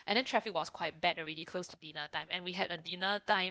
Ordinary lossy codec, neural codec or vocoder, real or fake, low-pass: none; codec, 16 kHz, 0.8 kbps, ZipCodec; fake; none